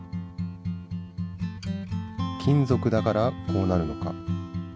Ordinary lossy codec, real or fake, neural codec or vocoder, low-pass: none; real; none; none